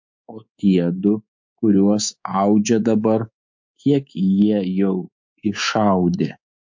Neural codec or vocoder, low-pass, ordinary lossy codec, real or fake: codec, 24 kHz, 3.1 kbps, DualCodec; 7.2 kHz; MP3, 48 kbps; fake